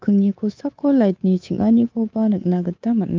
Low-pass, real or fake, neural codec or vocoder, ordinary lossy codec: 7.2 kHz; fake; codec, 24 kHz, 3.1 kbps, DualCodec; Opus, 24 kbps